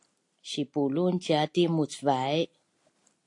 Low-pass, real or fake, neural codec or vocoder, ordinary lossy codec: 10.8 kHz; real; none; AAC, 48 kbps